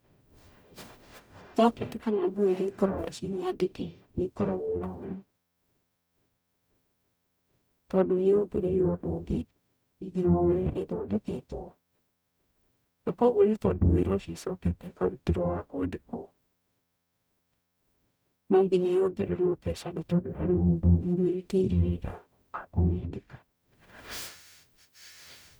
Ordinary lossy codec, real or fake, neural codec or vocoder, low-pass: none; fake; codec, 44.1 kHz, 0.9 kbps, DAC; none